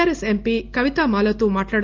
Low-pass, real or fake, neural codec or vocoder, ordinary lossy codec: 7.2 kHz; real; none; Opus, 32 kbps